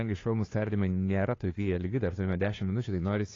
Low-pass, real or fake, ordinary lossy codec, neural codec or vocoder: 7.2 kHz; fake; AAC, 32 kbps; codec, 16 kHz, 2 kbps, FunCodec, trained on Chinese and English, 25 frames a second